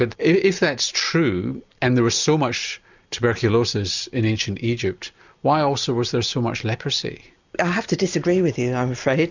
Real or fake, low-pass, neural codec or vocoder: real; 7.2 kHz; none